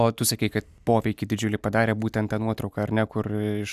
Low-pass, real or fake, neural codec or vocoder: 14.4 kHz; real; none